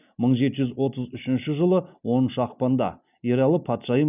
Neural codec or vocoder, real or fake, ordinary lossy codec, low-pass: none; real; none; 3.6 kHz